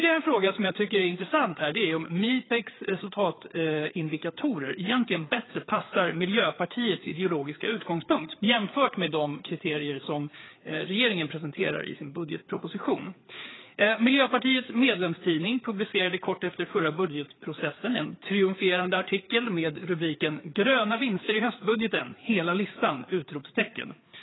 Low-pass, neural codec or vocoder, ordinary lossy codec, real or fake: 7.2 kHz; codec, 16 kHz, 4 kbps, FreqCodec, larger model; AAC, 16 kbps; fake